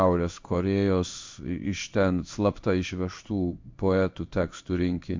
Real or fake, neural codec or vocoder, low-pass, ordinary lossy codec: fake; codec, 16 kHz in and 24 kHz out, 1 kbps, XY-Tokenizer; 7.2 kHz; MP3, 64 kbps